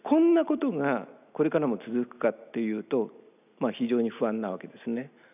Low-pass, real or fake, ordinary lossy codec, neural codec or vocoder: 3.6 kHz; real; none; none